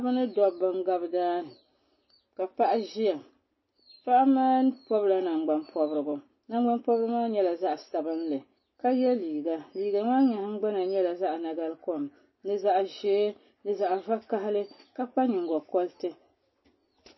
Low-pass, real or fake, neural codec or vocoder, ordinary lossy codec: 7.2 kHz; real; none; MP3, 24 kbps